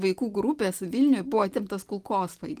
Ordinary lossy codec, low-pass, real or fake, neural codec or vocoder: Opus, 24 kbps; 14.4 kHz; real; none